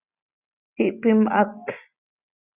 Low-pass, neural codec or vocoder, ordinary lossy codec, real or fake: 3.6 kHz; none; Opus, 64 kbps; real